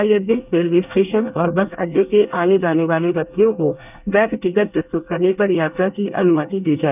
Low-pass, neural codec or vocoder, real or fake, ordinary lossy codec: 3.6 kHz; codec, 24 kHz, 1 kbps, SNAC; fake; none